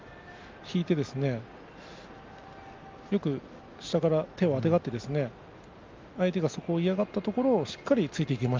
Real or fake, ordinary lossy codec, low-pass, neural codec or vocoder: real; Opus, 32 kbps; 7.2 kHz; none